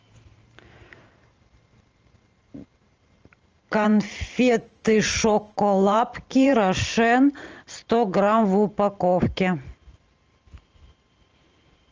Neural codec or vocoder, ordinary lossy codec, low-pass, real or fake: vocoder, 44.1 kHz, 128 mel bands every 512 samples, BigVGAN v2; Opus, 24 kbps; 7.2 kHz; fake